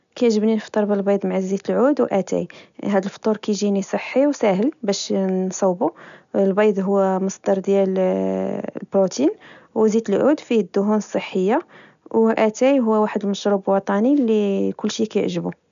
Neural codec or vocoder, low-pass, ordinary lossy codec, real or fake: none; 7.2 kHz; none; real